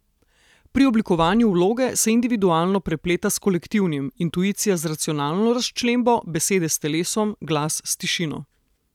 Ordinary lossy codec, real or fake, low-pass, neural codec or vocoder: none; real; 19.8 kHz; none